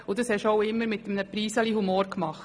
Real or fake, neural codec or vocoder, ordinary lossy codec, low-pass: real; none; none; none